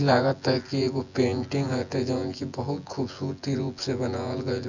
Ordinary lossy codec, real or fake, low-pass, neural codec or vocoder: none; fake; 7.2 kHz; vocoder, 24 kHz, 100 mel bands, Vocos